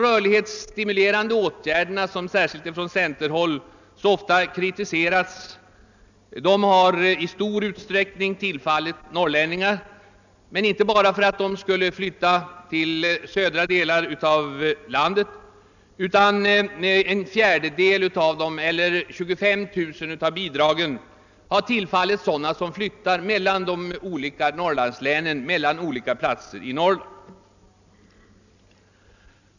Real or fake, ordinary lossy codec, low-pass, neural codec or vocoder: real; none; 7.2 kHz; none